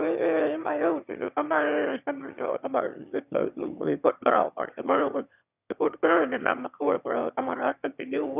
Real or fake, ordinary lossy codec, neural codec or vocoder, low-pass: fake; none; autoencoder, 22.05 kHz, a latent of 192 numbers a frame, VITS, trained on one speaker; 3.6 kHz